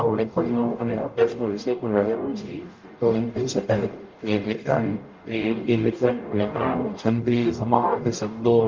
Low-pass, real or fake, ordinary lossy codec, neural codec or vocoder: 7.2 kHz; fake; Opus, 24 kbps; codec, 44.1 kHz, 0.9 kbps, DAC